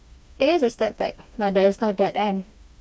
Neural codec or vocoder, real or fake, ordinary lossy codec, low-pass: codec, 16 kHz, 2 kbps, FreqCodec, smaller model; fake; none; none